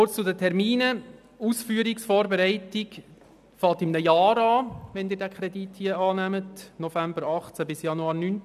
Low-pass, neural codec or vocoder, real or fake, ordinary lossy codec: 14.4 kHz; none; real; none